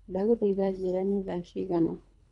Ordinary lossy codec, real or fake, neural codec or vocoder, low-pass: MP3, 96 kbps; fake; codec, 24 kHz, 3 kbps, HILCodec; 10.8 kHz